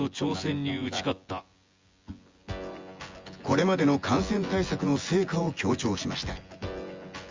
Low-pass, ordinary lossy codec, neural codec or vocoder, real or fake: 7.2 kHz; Opus, 32 kbps; vocoder, 24 kHz, 100 mel bands, Vocos; fake